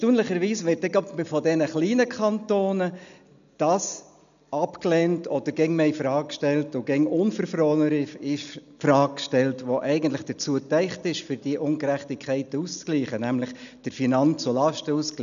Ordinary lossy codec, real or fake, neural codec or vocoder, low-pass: none; real; none; 7.2 kHz